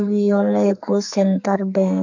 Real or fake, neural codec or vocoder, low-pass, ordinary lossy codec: fake; codec, 44.1 kHz, 2.6 kbps, SNAC; 7.2 kHz; none